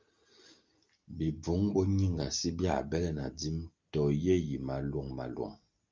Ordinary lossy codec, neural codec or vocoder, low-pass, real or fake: Opus, 24 kbps; none; 7.2 kHz; real